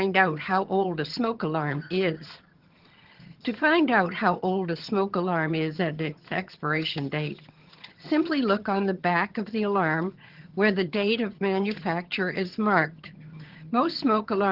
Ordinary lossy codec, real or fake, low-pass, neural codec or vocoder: Opus, 16 kbps; fake; 5.4 kHz; vocoder, 22.05 kHz, 80 mel bands, HiFi-GAN